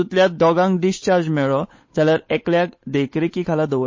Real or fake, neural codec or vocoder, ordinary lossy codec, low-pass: fake; codec, 16 kHz, 8 kbps, FunCodec, trained on Chinese and English, 25 frames a second; MP3, 32 kbps; 7.2 kHz